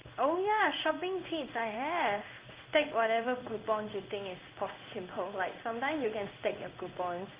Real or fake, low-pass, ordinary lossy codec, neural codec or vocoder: fake; 3.6 kHz; Opus, 24 kbps; codec, 16 kHz in and 24 kHz out, 1 kbps, XY-Tokenizer